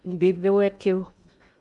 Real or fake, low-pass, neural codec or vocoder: fake; 10.8 kHz; codec, 16 kHz in and 24 kHz out, 0.6 kbps, FocalCodec, streaming, 2048 codes